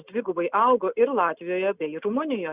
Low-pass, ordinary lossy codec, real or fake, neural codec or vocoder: 3.6 kHz; Opus, 64 kbps; real; none